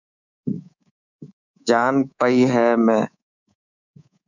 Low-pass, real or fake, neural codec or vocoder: 7.2 kHz; fake; codec, 24 kHz, 3.1 kbps, DualCodec